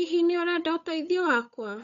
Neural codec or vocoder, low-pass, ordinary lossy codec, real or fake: codec, 16 kHz, 8 kbps, FunCodec, trained on Chinese and English, 25 frames a second; 7.2 kHz; none; fake